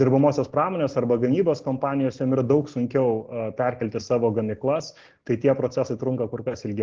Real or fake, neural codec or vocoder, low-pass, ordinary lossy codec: real; none; 7.2 kHz; Opus, 16 kbps